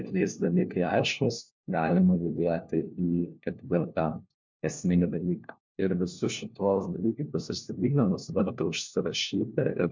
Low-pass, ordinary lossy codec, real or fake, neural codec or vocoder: 7.2 kHz; MP3, 64 kbps; fake; codec, 16 kHz, 1 kbps, FunCodec, trained on LibriTTS, 50 frames a second